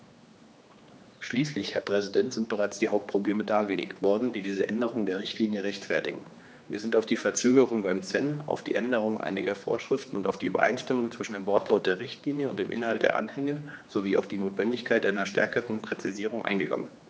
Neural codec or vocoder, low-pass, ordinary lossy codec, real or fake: codec, 16 kHz, 2 kbps, X-Codec, HuBERT features, trained on general audio; none; none; fake